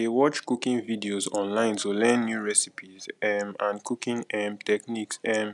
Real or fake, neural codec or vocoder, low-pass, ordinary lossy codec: real; none; 10.8 kHz; none